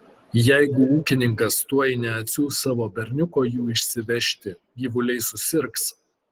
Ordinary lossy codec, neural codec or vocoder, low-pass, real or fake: Opus, 16 kbps; none; 19.8 kHz; real